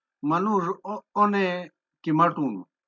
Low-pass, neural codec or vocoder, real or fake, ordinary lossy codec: 7.2 kHz; none; real; MP3, 64 kbps